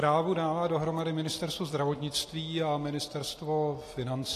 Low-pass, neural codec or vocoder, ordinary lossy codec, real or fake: 14.4 kHz; none; AAC, 48 kbps; real